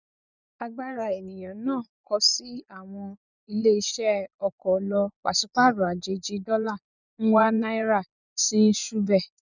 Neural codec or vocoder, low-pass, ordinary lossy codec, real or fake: vocoder, 24 kHz, 100 mel bands, Vocos; 7.2 kHz; none; fake